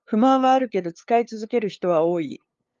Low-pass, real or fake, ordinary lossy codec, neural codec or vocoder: 7.2 kHz; fake; Opus, 32 kbps; codec, 16 kHz, 2 kbps, FunCodec, trained on LibriTTS, 25 frames a second